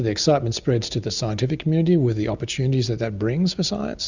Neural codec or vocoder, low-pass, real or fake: none; 7.2 kHz; real